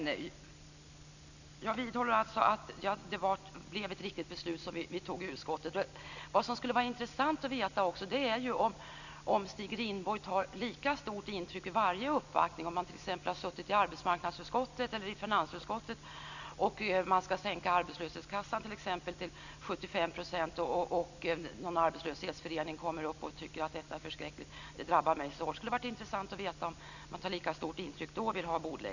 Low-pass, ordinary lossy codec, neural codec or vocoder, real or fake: 7.2 kHz; none; none; real